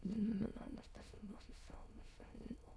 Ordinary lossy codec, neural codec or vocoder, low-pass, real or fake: none; autoencoder, 22.05 kHz, a latent of 192 numbers a frame, VITS, trained on many speakers; none; fake